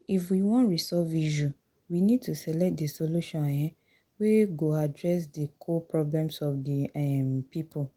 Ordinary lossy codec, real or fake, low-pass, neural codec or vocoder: Opus, 24 kbps; real; 19.8 kHz; none